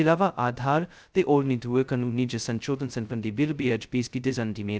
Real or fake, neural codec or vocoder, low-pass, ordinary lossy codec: fake; codec, 16 kHz, 0.2 kbps, FocalCodec; none; none